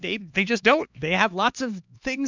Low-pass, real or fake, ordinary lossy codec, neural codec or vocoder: 7.2 kHz; fake; MP3, 64 kbps; codec, 16 kHz, 2 kbps, X-Codec, HuBERT features, trained on LibriSpeech